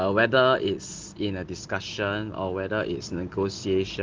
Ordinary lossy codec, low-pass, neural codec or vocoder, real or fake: Opus, 16 kbps; 7.2 kHz; none; real